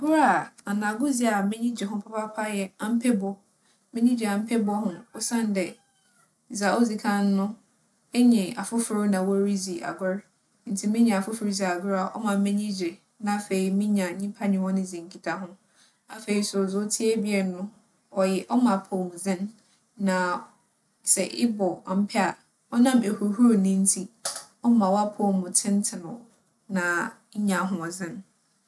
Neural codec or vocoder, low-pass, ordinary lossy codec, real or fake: none; none; none; real